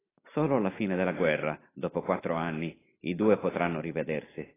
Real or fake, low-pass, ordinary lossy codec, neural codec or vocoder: real; 3.6 kHz; AAC, 16 kbps; none